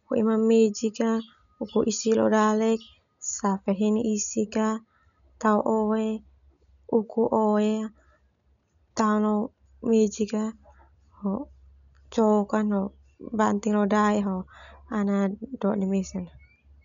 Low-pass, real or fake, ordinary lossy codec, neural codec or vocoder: 7.2 kHz; real; none; none